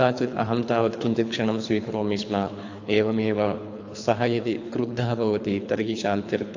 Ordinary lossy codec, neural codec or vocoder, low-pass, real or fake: AAC, 48 kbps; codec, 24 kHz, 3 kbps, HILCodec; 7.2 kHz; fake